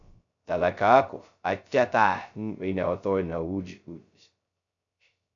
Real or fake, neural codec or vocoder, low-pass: fake; codec, 16 kHz, 0.2 kbps, FocalCodec; 7.2 kHz